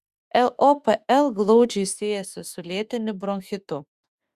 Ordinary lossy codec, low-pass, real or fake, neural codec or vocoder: Opus, 64 kbps; 14.4 kHz; fake; autoencoder, 48 kHz, 32 numbers a frame, DAC-VAE, trained on Japanese speech